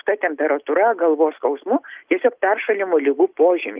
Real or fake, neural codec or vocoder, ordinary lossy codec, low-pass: real; none; Opus, 32 kbps; 3.6 kHz